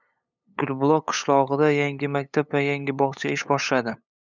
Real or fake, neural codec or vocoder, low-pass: fake; codec, 16 kHz, 8 kbps, FunCodec, trained on LibriTTS, 25 frames a second; 7.2 kHz